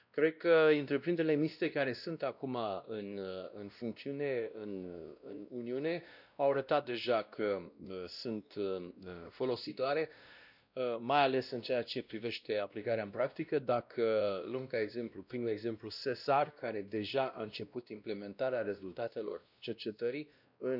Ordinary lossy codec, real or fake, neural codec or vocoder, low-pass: none; fake; codec, 16 kHz, 1 kbps, X-Codec, WavLM features, trained on Multilingual LibriSpeech; 5.4 kHz